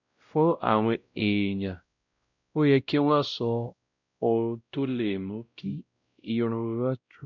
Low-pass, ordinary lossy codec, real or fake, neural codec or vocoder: 7.2 kHz; none; fake; codec, 16 kHz, 0.5 kbps, X-Codec, WavLM features, trained on Multilingual LibriSpeech